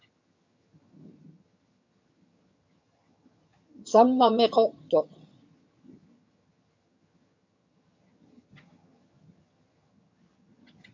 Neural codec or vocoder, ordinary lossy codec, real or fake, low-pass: vocoder, 22.05 kHz, 80 mel bands, HiFi-GAN; MP3, 64 kbps; fake; 7.2 kHz